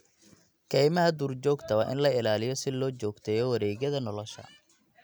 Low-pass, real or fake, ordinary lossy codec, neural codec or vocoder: none; real; none; none